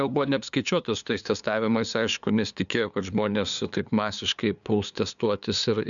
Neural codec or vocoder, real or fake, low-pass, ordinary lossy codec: codec, 16 kHz, 2 kbps, FunCodec, trained on LibriTTS, 25 frames a second; fake; 7.2 kHz; MP3, 96 kbps